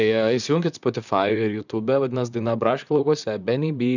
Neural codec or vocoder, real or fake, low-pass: vocoder, 44.1 kHz, 128 mel bands, Pupu-Vocoder; fake; 7.2 kHz